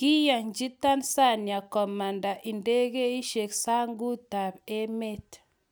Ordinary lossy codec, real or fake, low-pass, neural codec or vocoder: none; real; none; none